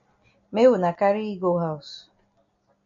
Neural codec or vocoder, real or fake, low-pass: none; real; 7.2 kHz